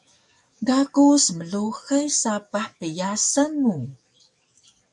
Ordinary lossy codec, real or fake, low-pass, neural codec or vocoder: MP3, 96 kbps; fake; 10.8 kHz; codec, 44.1 kHz, 7.8 kbps, DAC